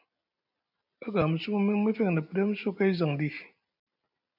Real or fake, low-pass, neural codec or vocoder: real; 5.4 kHz; none